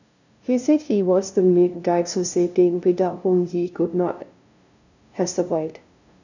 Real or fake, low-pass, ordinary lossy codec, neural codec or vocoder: fake; 7.2 kHz; none; codec, 16 kHz, 0.5 kbps, FunCodec, trained on LibriTTS, 25 frames a second